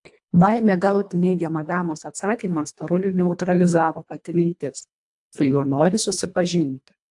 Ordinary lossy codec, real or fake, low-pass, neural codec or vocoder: AAC, 64 kbps; fake; 10.8 kHz; codec, 24 kHz, 1.5 kbps, HILCodec